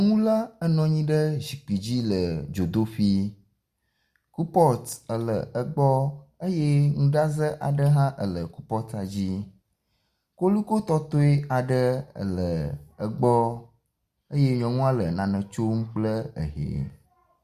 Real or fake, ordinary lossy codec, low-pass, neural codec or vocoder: real; Opus, 64 kbps; 14.4 kHz; none